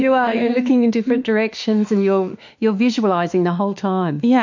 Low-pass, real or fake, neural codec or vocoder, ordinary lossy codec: 7.2 kHz; fake; autoencoder, 48 kHz, 32 numbers a frame, DAC-VAE, trained on Japanese speech; MP3, 48 kbps